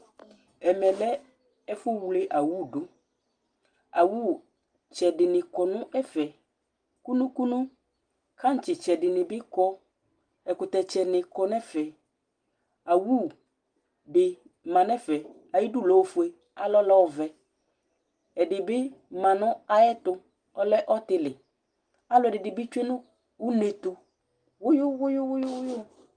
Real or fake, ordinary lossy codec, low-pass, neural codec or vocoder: real; Opus, 32 kbps; 9.9 kHz; none